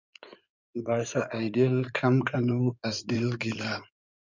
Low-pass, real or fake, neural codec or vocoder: 7.2 kHz; fake; codec, 16 kHz in and 24 kHz out, 2.2 kbps, FireRedTTS-2 codec